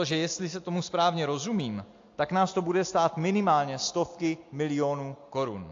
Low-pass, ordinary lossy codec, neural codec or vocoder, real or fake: 7.2 kHz; AAC, 48 kbps; none; real